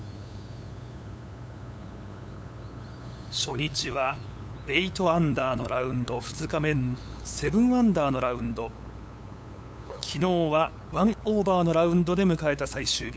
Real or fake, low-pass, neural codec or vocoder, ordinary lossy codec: fake; none; codec, 16 kHz, 8 kbps, FunCodec, trained on LibriTTS, 25 frames a second; none